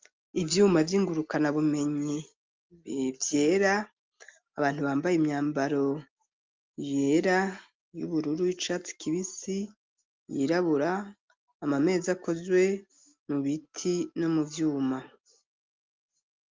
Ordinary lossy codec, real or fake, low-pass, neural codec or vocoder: Opus, 32 kbps; real; 7.2 kHz; none